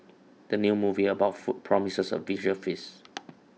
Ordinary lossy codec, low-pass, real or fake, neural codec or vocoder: none; none; real; none